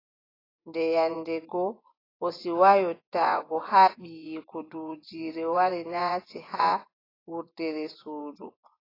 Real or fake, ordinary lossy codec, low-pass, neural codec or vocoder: fake; AAC, 24 kbps; 5.4 kHz; vocoder, 24 kHz, 100 mel bands, Vocos